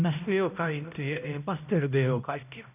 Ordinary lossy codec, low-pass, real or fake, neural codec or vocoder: none; 3.6 kHz; fake; codec, 16 kHz, 0.5 kbps, X-Codec, HuBERT features, trained on balanced general audio